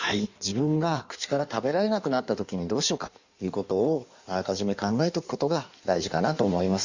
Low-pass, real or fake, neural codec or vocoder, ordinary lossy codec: 7.2 kHz; fake; codec, 16 kHz in and 24 kHz out, 1.1 kbps, FireRedTTS-2 codec; Opus, 64 kbps